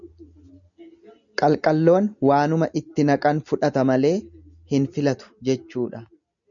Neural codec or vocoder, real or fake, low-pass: none; real; 7.2 kHz